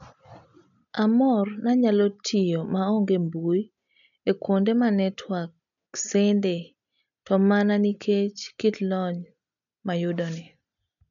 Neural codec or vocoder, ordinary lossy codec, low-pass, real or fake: none; none; 7.2 kHz; real